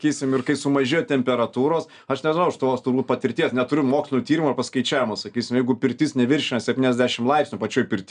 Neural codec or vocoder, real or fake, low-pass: none; real; 9.9 kHz